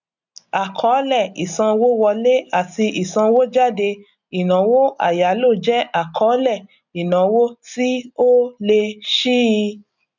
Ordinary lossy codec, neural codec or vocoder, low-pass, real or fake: none; none; 7.2 kHz; real